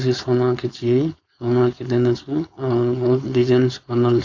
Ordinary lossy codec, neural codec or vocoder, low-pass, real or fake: AAC, 48 kbps; codec, 16 kHz, 4.8 kbps, FACodec; 7.2 kHz; fake